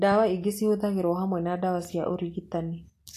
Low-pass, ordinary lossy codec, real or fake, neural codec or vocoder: 14.4 kHz; AAC, 64 kbps; real; none